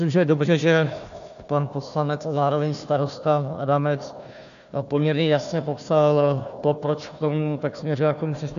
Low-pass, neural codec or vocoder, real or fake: 7.2 kHz; codec, 16 kHz, 1 kbps, FunCodec, trained on Chinese and English, 50 frames a second; fake